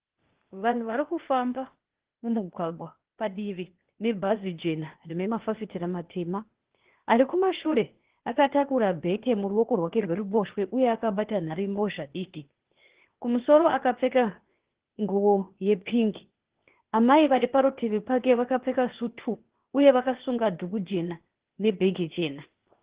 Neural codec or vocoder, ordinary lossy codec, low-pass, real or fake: codec, 16 kHz, 0.8 kbps, ZipCodec; Opus, 16 kbps; 3.6 kHz; fake